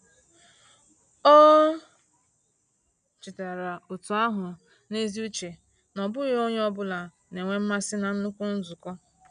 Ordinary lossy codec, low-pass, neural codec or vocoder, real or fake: none; 9.9 kHz; none; real